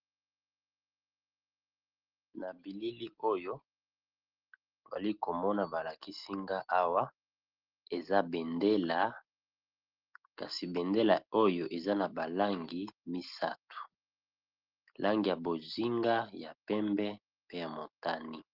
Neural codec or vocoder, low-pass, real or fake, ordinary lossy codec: none; 5.4 kHz; real; Opus, 16 kbps